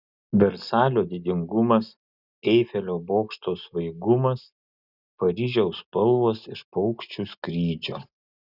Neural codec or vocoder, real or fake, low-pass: none; real; 5.4 kHz